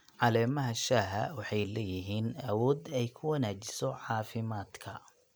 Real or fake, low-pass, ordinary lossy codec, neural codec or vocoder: real; none; none; none